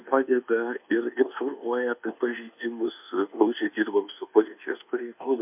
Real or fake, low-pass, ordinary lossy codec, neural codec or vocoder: fake; 3.6 kHz; MP3, 32 kbps; codec, 24 kHz, 1.2 kbps, DualCodec